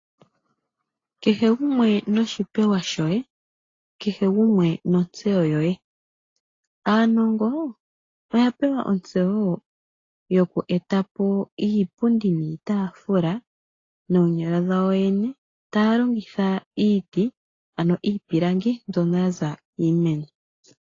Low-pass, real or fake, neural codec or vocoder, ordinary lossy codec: 7.2 kHz; real; none; AAC, 32 kbps